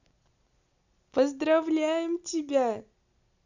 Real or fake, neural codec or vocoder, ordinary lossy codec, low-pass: real; none; none; 7.2 kHz